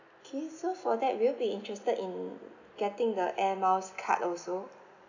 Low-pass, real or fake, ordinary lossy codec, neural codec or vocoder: 7.2 kHz; real; none; none